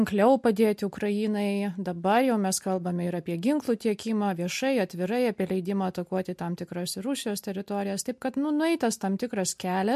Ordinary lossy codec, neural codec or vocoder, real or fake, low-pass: MP3, 64 kbps; none; real; 14.4 kHz